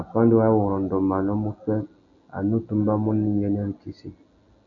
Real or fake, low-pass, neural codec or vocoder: real; 7.2 kHz; none